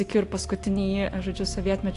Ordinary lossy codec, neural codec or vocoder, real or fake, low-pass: AAC, 48 kbps; none; real; 10.8 kHz